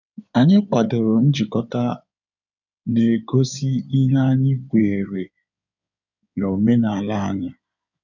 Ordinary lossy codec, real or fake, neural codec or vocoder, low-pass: none; fake; codec, 16 kHz in and 24 kHz out, 2.2 kbps, FireRedTTS-2 codec; 7.2 kHz